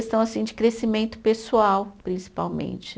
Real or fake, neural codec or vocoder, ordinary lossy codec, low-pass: real; none; none; none